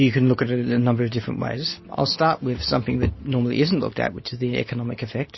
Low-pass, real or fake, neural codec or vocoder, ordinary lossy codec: 7.2 kHz; real; none; MP3, 24 kbps